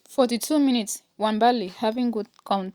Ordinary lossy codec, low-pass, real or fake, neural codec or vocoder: Opus, 64 kbps; 19.8 kHz; real; none